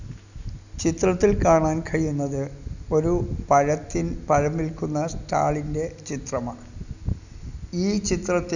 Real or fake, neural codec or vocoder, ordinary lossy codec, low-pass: real; none; none; 7.2 kHz